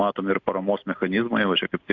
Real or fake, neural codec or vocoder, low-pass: real; none; 7.2 kHz